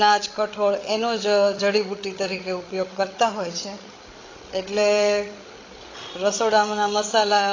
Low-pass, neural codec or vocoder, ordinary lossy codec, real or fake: 7.2 kHz; codec, 16 kHz, 8 kbps, FreqCodec, larger model; AAC, 32 kbps; fake